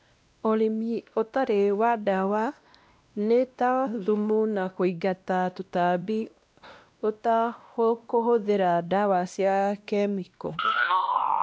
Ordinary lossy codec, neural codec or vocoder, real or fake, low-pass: none; codec, 16 kHz, 1 kbps, X-Codec, WavLM features, trained on Multilingual LibriSpeech; fake; none